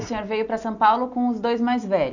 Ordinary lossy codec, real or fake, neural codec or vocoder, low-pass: none; real; none; 7.2 kHz